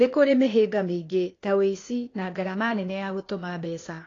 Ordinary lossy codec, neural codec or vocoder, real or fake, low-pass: AAC, 32 kbps; codec, 16 kHz, 0.8 kbps, ZipCodec; fake; 7.2 kHz